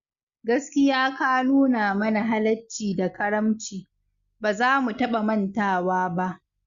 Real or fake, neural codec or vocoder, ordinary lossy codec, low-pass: real; none; Opus, 64 kbps; 7.2 kHz